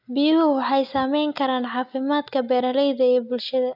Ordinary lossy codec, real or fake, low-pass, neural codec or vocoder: none; real; 5.4 kHz; none